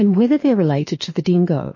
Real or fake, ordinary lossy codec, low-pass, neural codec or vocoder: fake; MP3, 32 kbps; 7.2 kHz; autoencoder, 48 kHz, 32 numbers a frame, DAC-VAE, trained on Japanese speech